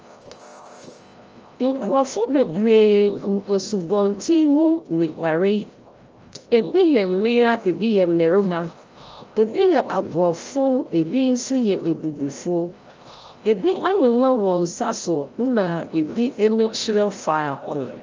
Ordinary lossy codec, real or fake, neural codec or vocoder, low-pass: Opus, 24 kbps; fake; codec, 16 kHz, 0.5 kbps, FreqCodec, larger model; 7.2 kHz